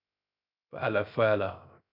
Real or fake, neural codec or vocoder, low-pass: fake; codec, 16 kHz, 0.3 kbps, FocalCodec; 5.4 kHz